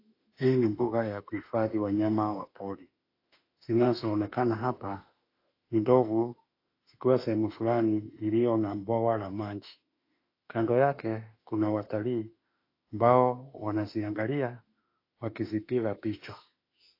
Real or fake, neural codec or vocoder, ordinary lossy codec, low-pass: fake; autoencoder, 48 kHz, 32 numbers a frame, DAC-VAE, trained on Japanese speech; AAC, 32 kbps; 5.4 kHz